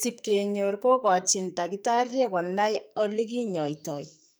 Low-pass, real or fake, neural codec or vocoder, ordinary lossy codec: none; fake; codec, 44.1 kHz, 2.6 kbps, SNAC; none